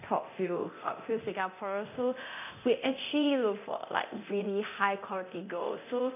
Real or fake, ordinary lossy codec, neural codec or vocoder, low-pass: fake; none; codec, 24 kHz, 0.9 kbps, DualCodec; 3.6 kHz